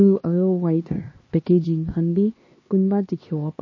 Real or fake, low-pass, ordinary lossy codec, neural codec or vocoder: fake; 7.2 kHz; MP3, 32 kbps; codec, 16 kHz, 2 kbps, X-Codec, HuBERT features, trained on LibriSpeech